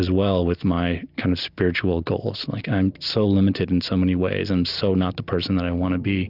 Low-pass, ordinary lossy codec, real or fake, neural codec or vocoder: 5.4 kHz; Opus, 64 kbps; real; none